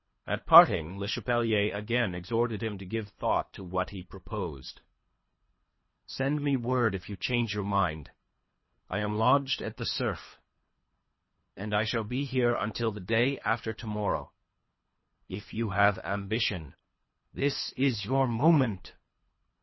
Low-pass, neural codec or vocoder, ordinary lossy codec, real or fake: 7.2 kHz; codec, 24 kHz, 3 kbps, HILCodec; MP3, 24 kbps; fake